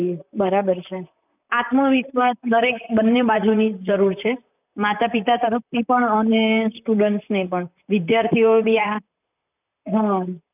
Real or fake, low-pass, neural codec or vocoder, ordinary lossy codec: fake; 3.6 kHz; vocoder, 44.1 kHz, 128 mel bands, Pupu-Vocoder; none